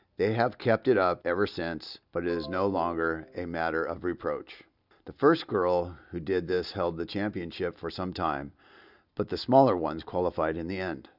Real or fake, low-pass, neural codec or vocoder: real; 5.4 kHz; none